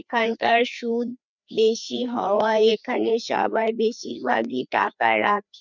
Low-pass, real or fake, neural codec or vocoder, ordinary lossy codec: 7.2 kHz; fake; codec, 16 kHz, 2 kbps, FreqCodec, larger model; none